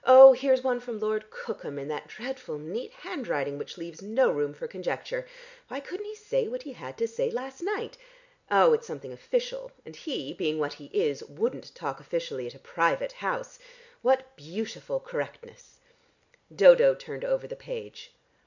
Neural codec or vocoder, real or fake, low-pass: none; real; 7.2 kHz